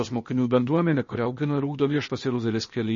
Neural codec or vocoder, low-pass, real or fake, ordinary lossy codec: codec, 16 kHz, 0.8 kbps, ZipCodec; 7.2 kHz; fake; MP3, 32 kbps